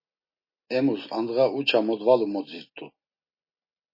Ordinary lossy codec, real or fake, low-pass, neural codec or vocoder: MP3, 24 kbps; real; 5.4 kHz; none